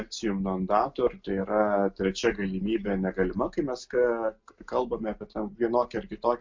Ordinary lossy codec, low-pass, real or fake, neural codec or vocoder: MP3, 64 kbps; 7.2 kHz; real; none